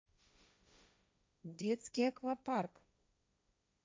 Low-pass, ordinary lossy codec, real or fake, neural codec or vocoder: none; none; fake; codec, 16 kHz, 1.1 kbps, Voila-Tokenizer